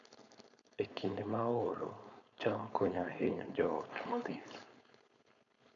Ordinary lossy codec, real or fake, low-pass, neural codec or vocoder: none; fake; 7.2 kHz; codec, 16 kHz, 4.8 kbps, FACodec